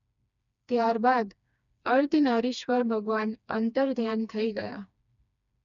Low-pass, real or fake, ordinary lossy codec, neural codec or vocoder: 7.2 kHz; fake; none; codec, 16 kHz, 2 kbps, FreqCodec, smaller model